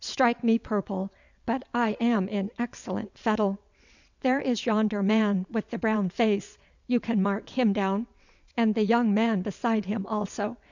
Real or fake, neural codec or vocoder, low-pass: fake; vocoder, 22.05 kHz, 80 mel bands, WaveNeXt; 7.2 kHz